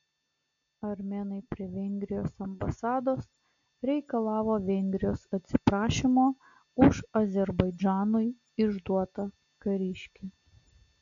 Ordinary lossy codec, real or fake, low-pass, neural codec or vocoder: MP3, 96 kbps; real; 7.2 kHz; none